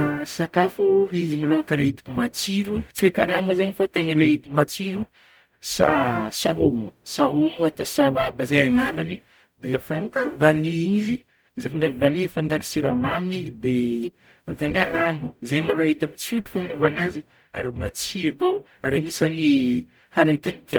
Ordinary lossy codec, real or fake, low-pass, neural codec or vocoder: none; fake; none; codec, 44.1 kHz, 0.9 kbps, DAC